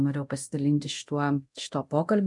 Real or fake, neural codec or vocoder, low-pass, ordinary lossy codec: fake; codec, 24 kHz, 0.9 kbps, DualCodec; 10.8 kHz; MP3, 64 kbps